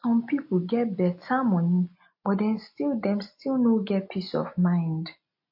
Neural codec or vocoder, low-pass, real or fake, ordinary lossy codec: none; 5.4 kHz; real; MP3, 32 kbps